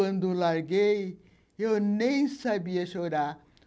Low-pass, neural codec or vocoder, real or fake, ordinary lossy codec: none; none; real; none